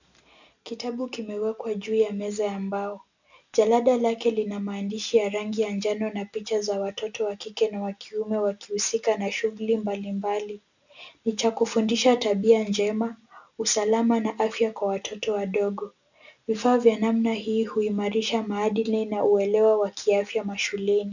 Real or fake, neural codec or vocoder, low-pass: real; none; 7.2 kHz